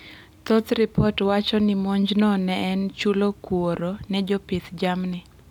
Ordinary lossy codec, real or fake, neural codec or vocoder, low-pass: none; real; none; 19.8 kHz